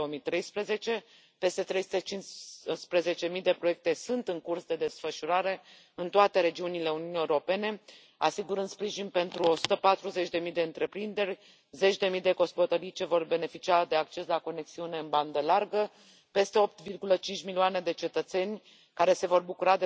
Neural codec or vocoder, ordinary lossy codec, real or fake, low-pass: none; none; real; none